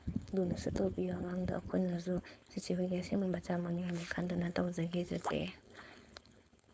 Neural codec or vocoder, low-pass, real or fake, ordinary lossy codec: codec, 16 kHz, 4.8 kbps, FACodec; none; fake; none